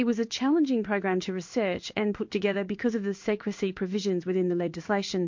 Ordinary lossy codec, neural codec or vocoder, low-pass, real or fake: MP3, 48 kbps; codec, 16 kHz in and 24 kHz out, 1 kbps, XY-Tokenizer; 7.2 kHz; fake